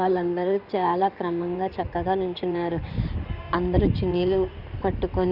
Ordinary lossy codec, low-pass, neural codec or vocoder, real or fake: none; 5.4 kHz; codec, 16 kHz in and 24 kHz out, 2.2 kbps, FireRedTTS-2 codec; fake